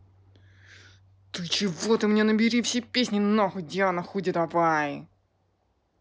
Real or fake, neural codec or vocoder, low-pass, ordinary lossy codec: real; none; none; none